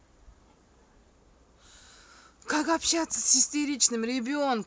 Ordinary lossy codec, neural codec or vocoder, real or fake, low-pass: none; none; real; none